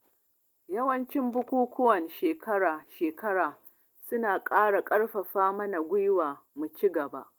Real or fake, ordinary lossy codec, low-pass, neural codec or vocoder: real; Opus, 24 kbps; 19.8 kHz; none